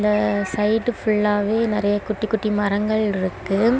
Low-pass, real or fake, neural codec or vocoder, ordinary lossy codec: none; real; none; none